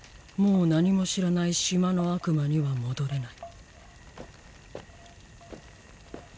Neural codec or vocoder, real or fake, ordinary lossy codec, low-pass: none; real; none; none